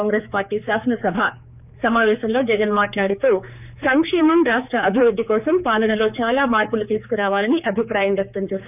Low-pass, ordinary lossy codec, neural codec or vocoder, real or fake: 3.6 kHz; none; codec, 16 kHz, 4 kbps, X-Codec, HuBERT features, trained on general audio; fake